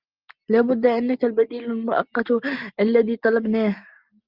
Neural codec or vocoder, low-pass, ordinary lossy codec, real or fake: none; 5.4 kHz; Opus, 24 kbps; real